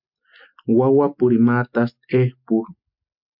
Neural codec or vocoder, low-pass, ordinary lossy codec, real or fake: none; 5.4 kHz; MP3, 48 kbps; real